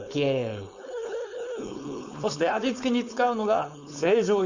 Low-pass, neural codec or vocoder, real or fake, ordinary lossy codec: 7.2 kHz; codec, 16 kHz, 4.8 kbps, FACodec; fake; Opus, 64 kbps